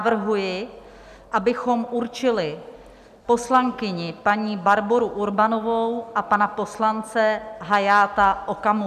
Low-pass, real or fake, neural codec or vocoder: 14.4 kHz; real; none